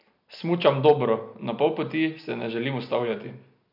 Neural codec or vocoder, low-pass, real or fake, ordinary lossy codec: none; 5.4 kHz; real; MP3, 48 kbps